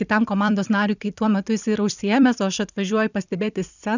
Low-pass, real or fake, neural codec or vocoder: 7.2 kHz; fake; vocoder, 44.1 kHz, 128 mel bands every 256 samples, BigVGAN v2